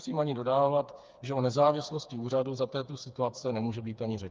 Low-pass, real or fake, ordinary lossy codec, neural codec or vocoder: 7.2 kHz; fake; Opus, 24 kbps; codec, 16 kHz, 4 kbps, FreqCodec, smaller model